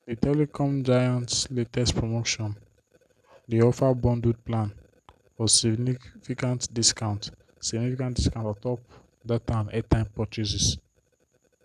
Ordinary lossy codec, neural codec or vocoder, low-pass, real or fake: none; none; 14.4 kHz; real